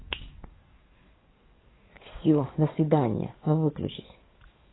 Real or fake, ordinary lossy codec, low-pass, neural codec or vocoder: fake; AAC, 16 kbps; 7.2 kHz; codec, 44.1 kHz, 7.8 kbps, DAC